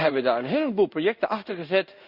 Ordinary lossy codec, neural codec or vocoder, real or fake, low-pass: Opus, 64 kbps; codec, 16 kHz in and 24 kHz out, 1 kbps, XY-Tokenizer; fake; 5.4 kHz